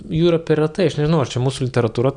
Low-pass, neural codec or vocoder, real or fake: 9.9 kHz; none; real